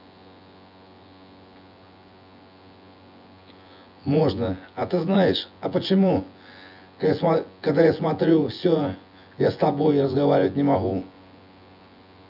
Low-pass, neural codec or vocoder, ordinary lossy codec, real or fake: 5.4 kHz; vocoder, 24 kHz, 100 mel bands, Vocos; none; fake